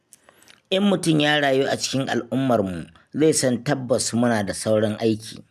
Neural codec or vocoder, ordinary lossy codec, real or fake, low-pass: none; none; real; 14.4 kHz